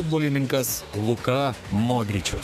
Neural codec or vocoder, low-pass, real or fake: codec, 32 kHz, 1.9 kbps, SNAC; 14.4 kHz; fake